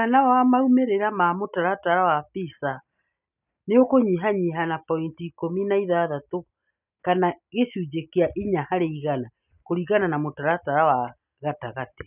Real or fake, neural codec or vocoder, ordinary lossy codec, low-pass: real; none; none; 3.6 kHz